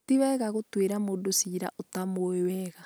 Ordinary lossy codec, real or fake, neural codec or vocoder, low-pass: none; real; none; none